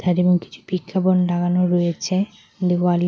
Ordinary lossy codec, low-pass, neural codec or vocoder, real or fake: none; none; none; real